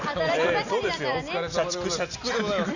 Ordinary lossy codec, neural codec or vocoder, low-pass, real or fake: none; none; 7.2 kHz; real